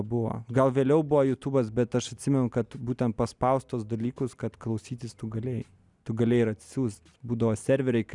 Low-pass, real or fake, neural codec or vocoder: 10.8 kHz; real; none